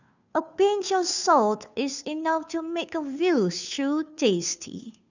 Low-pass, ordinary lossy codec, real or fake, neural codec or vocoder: 7.2 kHz; none; fake; codec, 16 kHz, 2 kbps, FunCodec, trained on Chinese and English, 25 frames a second